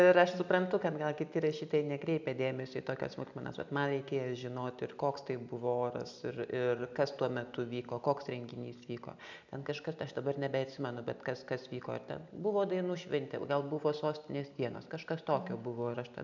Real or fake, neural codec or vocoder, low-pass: real; none; 7.2 kHz